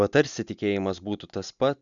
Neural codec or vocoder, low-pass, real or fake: none; 7.2 kHz; real